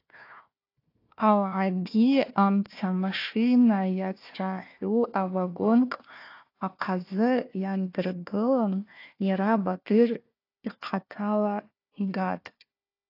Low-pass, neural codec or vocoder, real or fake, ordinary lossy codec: 5.4 kHz; codec, 16 kHz, 1 kbps, FunCodec, trained on Chinese and English, 50 frames a second; fake; AAC, 32 kbps